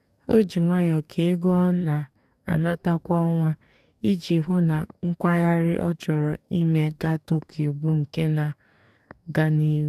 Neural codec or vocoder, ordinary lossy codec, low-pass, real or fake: codec, 44.1 kHz, 2.6 kbps, DAC; none; 14.4 kHz; fake